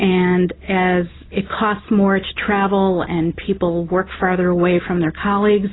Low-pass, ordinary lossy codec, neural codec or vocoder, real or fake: 7.2 kHz; AAC, 16 kbps; none; real